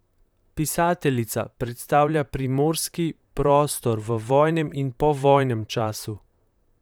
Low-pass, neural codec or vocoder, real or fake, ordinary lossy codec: none; vocoder, 44.1 kHz, 128 mel bands, Pupu-Vocoder; fake; none